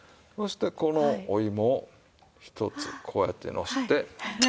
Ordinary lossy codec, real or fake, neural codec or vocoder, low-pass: none; real; none; none